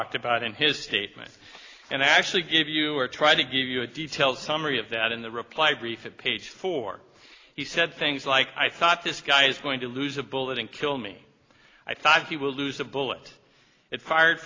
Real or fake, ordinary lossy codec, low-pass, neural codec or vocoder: real; AAC, 32 kbps; 7.2 kHz; none